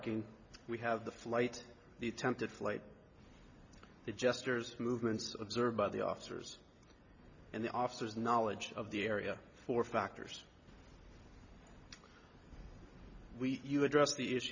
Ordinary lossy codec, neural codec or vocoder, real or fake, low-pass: Opus, 64 kbps; none; real; 7.2 kHz